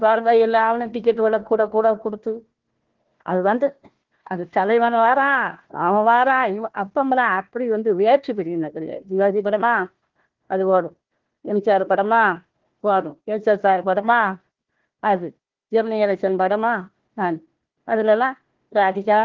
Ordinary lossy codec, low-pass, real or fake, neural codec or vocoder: Opus, 16 kbps; 7.2 kHz; fake; codec, 16 kHz, 1 kbps, FunCodec, trained on Chinese and English, 50 frames a second